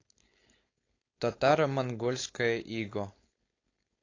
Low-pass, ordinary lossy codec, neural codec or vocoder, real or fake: 7.2 kHz; AAC, 32 kbps; codec, 16 kHz, 4.8 kbps, FACodec; fake